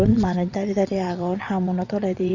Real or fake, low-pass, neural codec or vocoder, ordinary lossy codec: fake; 7.2 kHz; vocoder, 22.05 kHz, 80 mel bands, WaveNeXt; Opus, 64 kbps